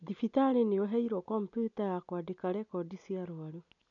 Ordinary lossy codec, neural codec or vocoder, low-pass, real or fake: none; none; 7.2 kHz; real